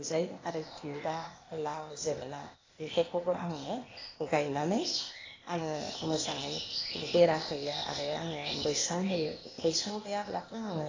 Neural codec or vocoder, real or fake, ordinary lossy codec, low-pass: codec, 16 kHz, 0.8 kbps, ZipCodec; fake; AAC, 32 kbps; 7.2 kHz